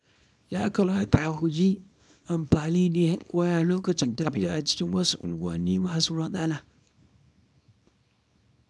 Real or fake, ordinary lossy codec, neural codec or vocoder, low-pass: fake; none; codec, 24 kHz, 0.9 kbps, WavTokenizer, small release; none